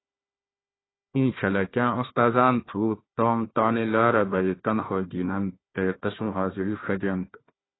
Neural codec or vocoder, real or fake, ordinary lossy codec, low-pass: codec, 16 kHz, 1 kbps, FunCodec, trained on Chinese and English, 50 frames a second; fake; AAC, 16 kbps; 7.2 kHz